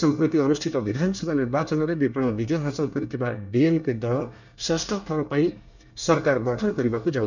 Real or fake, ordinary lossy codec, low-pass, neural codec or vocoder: fake; none; 7.2 kHz; codec, 24 kHz, 1 kbps, SNAC